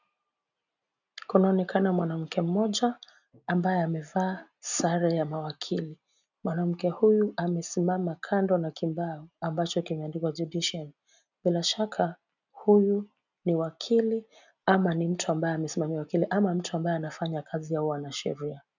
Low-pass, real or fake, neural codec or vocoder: 7.2 kHz; real; none